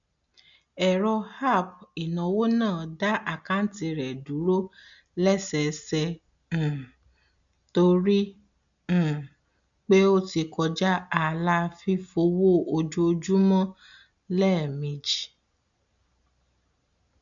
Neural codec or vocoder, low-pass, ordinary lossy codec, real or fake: none; 7.2 kHz; none; real